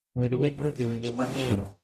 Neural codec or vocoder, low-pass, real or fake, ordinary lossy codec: codec, 44.1 kHz, 0.9 kbps, DAC; 14.4 kHz; fake; Opus, 64 kbps